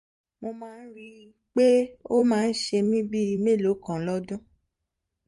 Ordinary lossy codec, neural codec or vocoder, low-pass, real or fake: MP3, 48 kbps; vocoder, 44.1 kHz, 128 mel bands every 512 samples, BigVGAN v2; 14.4 kHz; fake